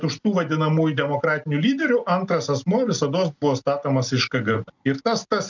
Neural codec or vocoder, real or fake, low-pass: none; real; 7.2 kHz